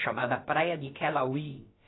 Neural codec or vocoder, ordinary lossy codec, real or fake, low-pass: codec, 16 kHz, about 1 kbps, DyCAST, with the encoder's durations; AAC, 16 kbps; fake; 7.2 kHz